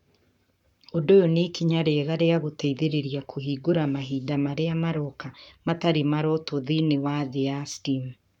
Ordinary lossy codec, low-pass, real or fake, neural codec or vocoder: none; 19.8 kHz; fake; codec, 44.1 kHz, 7.8 kbps, Pupu-Codec